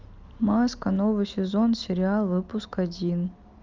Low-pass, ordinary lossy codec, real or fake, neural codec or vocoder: 7.2 kHz; Opus, 32 kbps; real; none